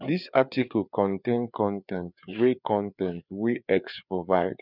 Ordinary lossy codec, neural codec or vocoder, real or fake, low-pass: none; codec, 16 kHz, 4 kbps, FreqCodec, larger model; fake; 5.4 kHz